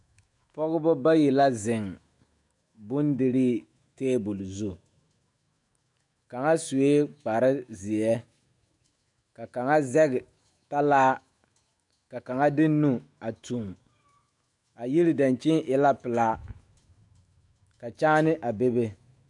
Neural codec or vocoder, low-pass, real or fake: autoencoder, 48 kHz, 128 numbers a frame, DAC-VAE, trained on Japanese speech; 10.8 kHz; fake